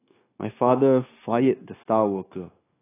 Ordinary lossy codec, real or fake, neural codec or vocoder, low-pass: AAC, 16 kbps; fake; codec, 16 kHz, 0.9 kbps, LongCat-Audio-Codec; 3.6 kHz